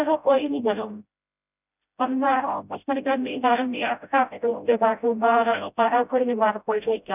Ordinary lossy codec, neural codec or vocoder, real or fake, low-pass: none; codec, 16 kHz, 0.5 kbps, FreqCodec, smaller model; fake; 3.6 kHz